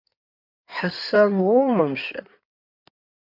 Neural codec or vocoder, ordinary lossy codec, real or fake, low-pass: codec, 16 kHz in and 24 kHz out, 2.2 kbps, FireRedTTS-2 codec; AAC, 48 kbps; fake; 5.4 kHz